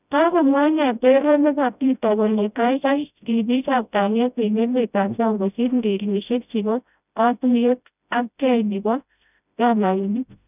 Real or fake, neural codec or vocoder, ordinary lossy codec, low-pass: fake; codec, 16 kHz, 0.5 kbps, FreqCodec, smaller model; none; 3.6 kHz